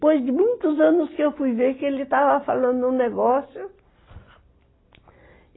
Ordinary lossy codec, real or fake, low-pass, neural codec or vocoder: AAC, 16 kbps; real; 7.2 kHz; none